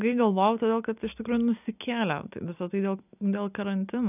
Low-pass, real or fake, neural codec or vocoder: 3.6 kHz; real; none